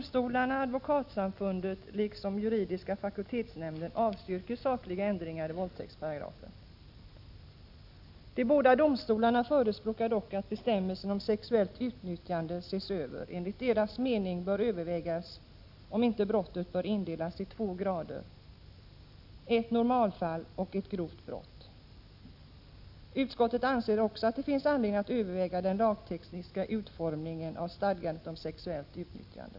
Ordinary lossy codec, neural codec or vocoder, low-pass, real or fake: AAC, 48 kbps; none; 5.4 kHz; real